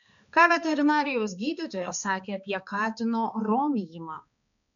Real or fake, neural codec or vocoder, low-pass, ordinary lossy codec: fake; codec, 16 kHz, 2 kbps, X-Codec, HuBERT features, trained on balanced general audio; 7.2 kHz; MP3, 96 kbps